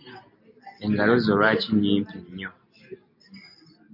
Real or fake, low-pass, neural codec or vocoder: real; 5.4 kHz; none